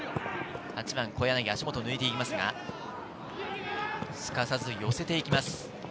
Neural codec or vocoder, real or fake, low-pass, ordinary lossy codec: none; real; none; none